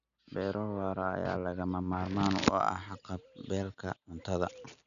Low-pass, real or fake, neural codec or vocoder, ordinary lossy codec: 7.2 kHz; real; none; none